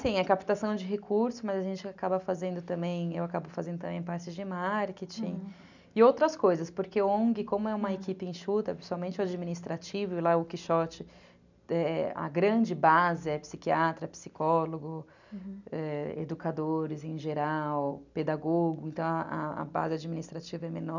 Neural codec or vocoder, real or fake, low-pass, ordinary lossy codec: none; real; 7.2 kHz; none